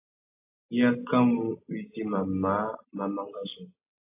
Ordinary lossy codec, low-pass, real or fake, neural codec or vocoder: AAC, 24 kbps; 3.6 kHz; real; none